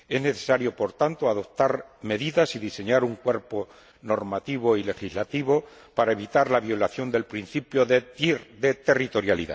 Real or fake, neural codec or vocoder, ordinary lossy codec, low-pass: real; none; none; none